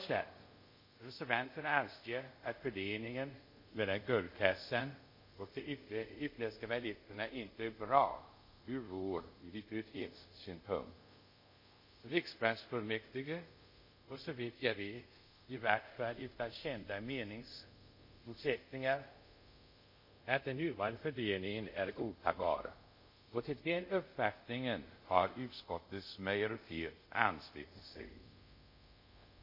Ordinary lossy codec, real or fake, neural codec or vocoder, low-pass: MP3, 32 kbps; fake; codec, 24 kHz, 0.5 kbps, DualCodec; 5.4 kHz